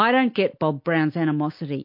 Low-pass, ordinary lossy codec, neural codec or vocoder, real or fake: 5.4 kHz; MP3, 32 kbps; none; real